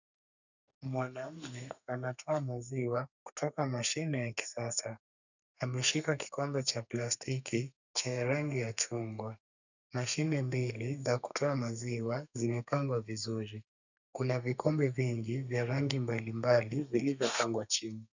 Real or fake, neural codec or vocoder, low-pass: fake; codec, 32 kHz, 1.9 kbps, SNAC; 7.2 kHz